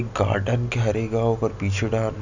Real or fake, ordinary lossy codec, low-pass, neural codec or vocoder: real; none; 7.2 kHz; none